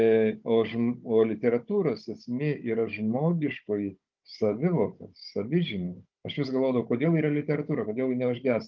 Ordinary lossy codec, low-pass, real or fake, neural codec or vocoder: Opus, 32 kbps; 7.2 kHz; fake; codec, 16 kHz, 16 kbps, FunCodec, trained on Chinese and English, 50 frames a second